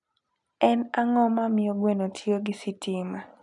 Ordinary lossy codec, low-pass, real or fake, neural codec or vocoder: none; 10.8 kHz; real; none